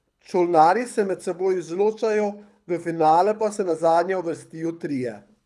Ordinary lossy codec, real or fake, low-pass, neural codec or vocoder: none; fake; none; codec, 24 kHz, 6 kbps, HILCodec